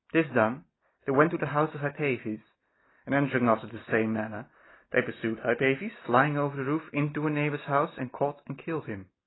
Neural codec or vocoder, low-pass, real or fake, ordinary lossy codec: none; 7.2 kHz; real; AAC, 16 kbps